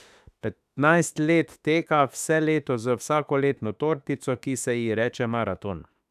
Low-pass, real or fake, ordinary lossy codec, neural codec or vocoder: 14.4 kHz; fake; none; autoencoder, 48 kHz, 32 numbers a frame, DAC-VAE, trained on Japanese speech